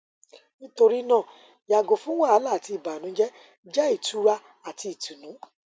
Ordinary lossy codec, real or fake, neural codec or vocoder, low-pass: none; real; none; none